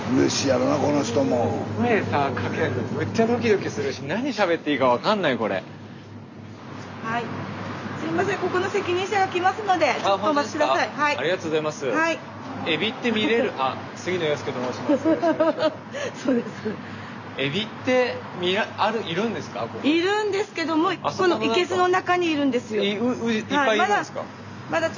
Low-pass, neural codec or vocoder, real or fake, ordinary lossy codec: 7.2 kHz; none; real; none